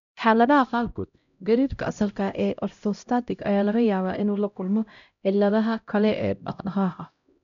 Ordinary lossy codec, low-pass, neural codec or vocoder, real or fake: none; 7.2 kHz; codec, 16 kHz, 0.5 kbps, X-Codec, HuBERT features, trained on LibriSpeech; fake